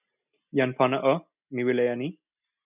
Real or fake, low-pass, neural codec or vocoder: real; 3.6 kHz; none